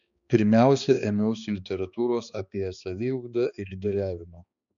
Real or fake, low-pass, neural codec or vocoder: fake; 7.2 kHz; codec, 16 kHz, 2 kbps, X-Codec, HuBERT features, trained on balanced general audio